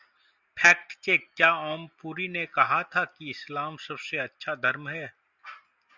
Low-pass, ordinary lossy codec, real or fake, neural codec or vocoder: 7.2 kHz; Opus, 64 kbps; real; none